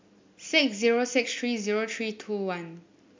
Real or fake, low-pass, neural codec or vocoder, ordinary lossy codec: real; 7.2 kHz; none; MP3, 64 kbps